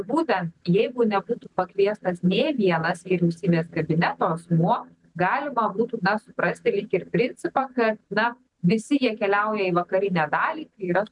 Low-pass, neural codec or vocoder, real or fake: 10.8 kHz; none; real